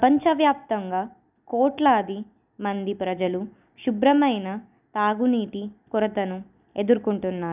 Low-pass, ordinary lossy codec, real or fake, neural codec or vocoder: 3.6 kHz; none; real; none